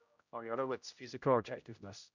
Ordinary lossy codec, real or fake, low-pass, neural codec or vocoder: none; fake; 7.2 kHz; codec, 16 kHz, 0.5 kbps, X-Codec, HuBERT features, trained on general audio